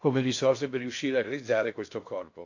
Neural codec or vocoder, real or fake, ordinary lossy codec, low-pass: codec, 16 kHz in and 24 kHz out, 0.6 kbps, FocalCodec, streaming, 2048 codes; fake; AAC, 48 kbps; 7.2 kHz